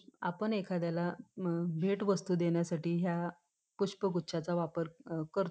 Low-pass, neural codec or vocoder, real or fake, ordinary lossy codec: none; none; real; none